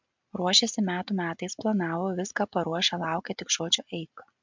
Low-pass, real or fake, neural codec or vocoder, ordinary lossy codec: 7.2 kHz; real; none; MP3, 64 kbps